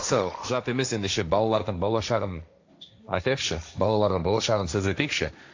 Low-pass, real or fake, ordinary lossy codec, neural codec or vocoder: 7.2 kHz; fake; AAC, 48 kbps; codec, 16 kHz, 1.1 kbps, Voila-Tokenizer